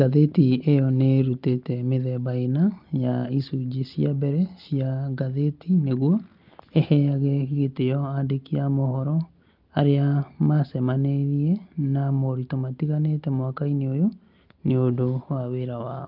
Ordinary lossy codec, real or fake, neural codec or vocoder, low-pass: Opus, 24 kbps; real; none; 5.4 kHz